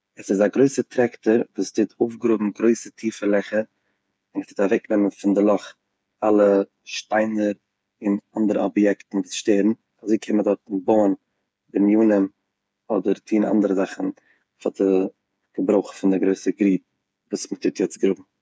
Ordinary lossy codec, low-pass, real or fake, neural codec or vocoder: none; none; fake; codec, 16 kHz, 8 kbps, FreqCodec, smaller model